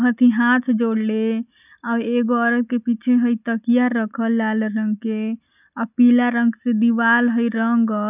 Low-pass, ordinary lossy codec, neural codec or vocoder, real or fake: 3.6 kHz; none; none; real